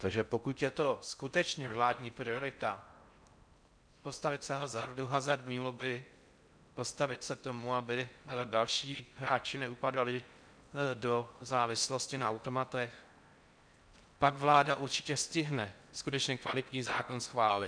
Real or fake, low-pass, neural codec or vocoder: fake; 9.9 kHz; codec, 16 kHz in and 24 kHz out, 0.6 kbps, FocalCodec, streaming, 4096 codes